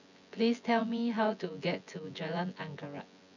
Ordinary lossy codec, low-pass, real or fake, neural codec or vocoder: none; 7.2 kHz; fake; vocoder, 24 kHz, 100 mel bands, Vocos